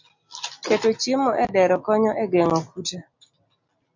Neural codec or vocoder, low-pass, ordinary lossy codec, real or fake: none; 7.2 kHz; MP3, 48 kbps; real